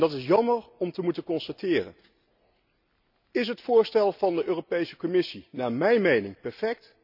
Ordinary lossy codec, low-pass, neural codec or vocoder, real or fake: none; 5.4 kHz; none; real